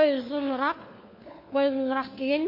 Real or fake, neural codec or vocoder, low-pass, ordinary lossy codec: fake; codec, 16 kHz, 4 kbps, FunCodec, trained on LibriTTS, 50 frames a second; 5.4 kHz; MP3, 32 kbps